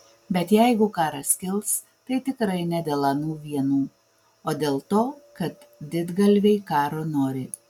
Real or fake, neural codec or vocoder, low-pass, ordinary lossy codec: real; none; 19.8 kHz; MP3, 96 kbps